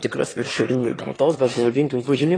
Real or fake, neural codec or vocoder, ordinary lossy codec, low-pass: fake; autoencoder, 22.05 kHz, a latent of 192 numbers a frame, VITS, trained on one speaker; AAC, 32 kbps; 9.9 kHz